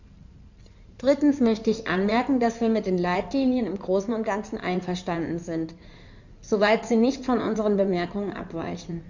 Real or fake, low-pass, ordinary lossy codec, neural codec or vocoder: fake; 7.2 kHz; none; codec, 16 kHz in and 24 kHz out, 2.2 kbps, FireRedTTS-2 codec